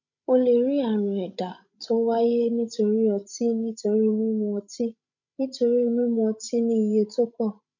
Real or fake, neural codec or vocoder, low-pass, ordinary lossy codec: fake; codec, 16 kHz, 16 kbps, FreqCodec, larger model; 7.2 kHz; none